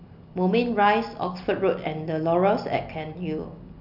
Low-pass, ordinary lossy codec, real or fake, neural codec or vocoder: 5.4 kHz; none; real; none